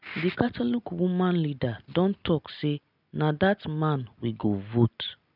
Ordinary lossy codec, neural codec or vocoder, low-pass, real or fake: none; none; 5.4 kHz; real